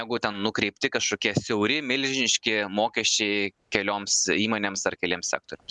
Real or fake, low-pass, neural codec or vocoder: real; 10.8 kHz; none